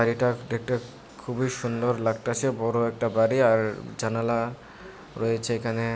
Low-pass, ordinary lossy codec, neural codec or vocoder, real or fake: none; none; none; real